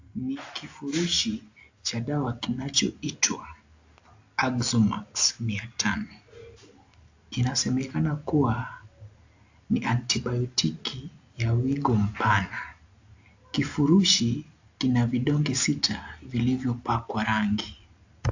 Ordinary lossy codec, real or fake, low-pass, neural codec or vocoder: AAC, 48 kbps; real; 7.2 kHz; none